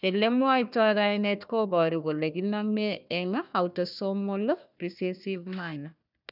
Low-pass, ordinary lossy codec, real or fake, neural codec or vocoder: 5.4 kHz; none; fake; codec, 16 kHz, 1 kbps, FunCodec, trained on Chinese and English, 50 frames a second